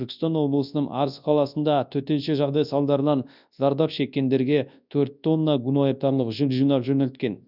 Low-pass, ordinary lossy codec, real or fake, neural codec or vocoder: 5.4 kHz; none; fake; codec, 24 kHz, 0.9 kbps, WavTokenizer, large speech release